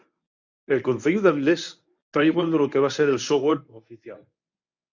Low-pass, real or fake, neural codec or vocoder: 7.2 kHz; fake; codec, 24 kHz, 0.9 kbps, WavTokenizer, medium speech release version 1